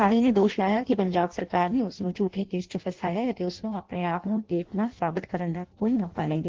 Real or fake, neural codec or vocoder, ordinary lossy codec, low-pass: fake; codec, 16 kHz in and 24 kHz out, 0.6 kbps, FireRedTTS-2 codec; Opus, 16 kbps; 7.2 kHz